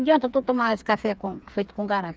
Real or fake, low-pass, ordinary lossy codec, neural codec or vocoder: fake; none; none; codec, 16 kHz, 4 kbps, FreqCodec, smaller model